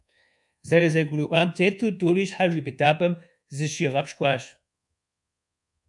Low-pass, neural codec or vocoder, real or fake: 10.8 kHz; codec, 24 kHz, 1.2 kbps, DualCodec; fake